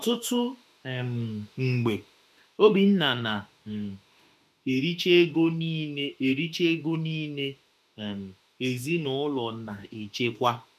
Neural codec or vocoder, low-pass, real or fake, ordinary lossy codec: autoencoder, 48 kHz, 32 numbers a frame, DAC-VAE, trained on Japanese speech; 14.4 kHz; fake; MP3, 96 kbps